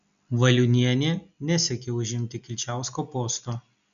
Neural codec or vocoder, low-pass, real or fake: none; 7.2 kHz; real